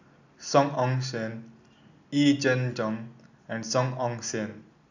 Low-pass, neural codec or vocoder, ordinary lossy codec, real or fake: 7.2 kHz; none; none; real